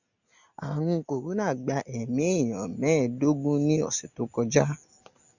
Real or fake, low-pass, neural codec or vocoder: real; 7.2 kHz; none